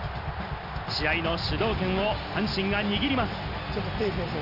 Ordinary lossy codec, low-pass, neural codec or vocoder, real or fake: none; 5.4 kHz; none; real